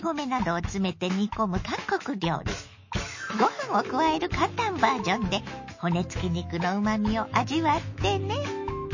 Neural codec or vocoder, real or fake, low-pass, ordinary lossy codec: autoencoder, 48 kHz, 128 numbers a frame, DAC-VAE, trained on Japanese speech; fake; 7.2 kHz; MP3, 32 kbps